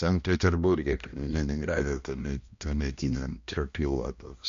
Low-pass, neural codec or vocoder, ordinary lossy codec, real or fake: 7.2 kHz; codec, 16 kHz, 1 kbps, X-Codec, HuBERT features, trained on balanced general audio; MP3, 48 kbps; fake